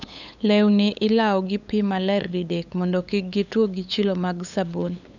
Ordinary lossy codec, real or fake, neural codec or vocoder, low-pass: none; fake; codec, 16 kHz, 8 kbps, FunCodec, trained on LibriTTS, 25 frames a second; 7.2 kHz